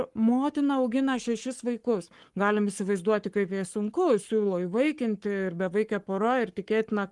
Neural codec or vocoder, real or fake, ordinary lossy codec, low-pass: codec, 44.1 kHz, 7.8 kbps, Pupu-Codec; fake; Opus, 24 kbps; 10.8 kHz